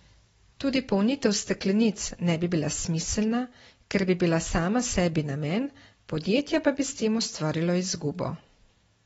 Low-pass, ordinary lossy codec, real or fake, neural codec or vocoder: 19.8 kHz; AAC, 24 kbps; real; none